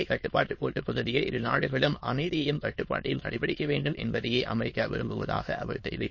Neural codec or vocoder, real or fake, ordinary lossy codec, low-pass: autoencoder, 22.05 kHz, a latent of 192 numbers a frame, VITS, trained on many speakers; fake; MP3, 32 kbps; 7.2 kHz